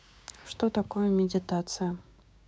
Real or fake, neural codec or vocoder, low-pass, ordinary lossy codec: fake; codec, 16 kHz, 6 kbps, DAC; none; none